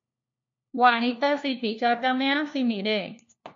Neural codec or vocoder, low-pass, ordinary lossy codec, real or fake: codec, 16 kHz, 1 kbps, FunCodec, trained on LibriTTS, 50 frames a second; 7.2 kHz; MP3, 48 kbps; fake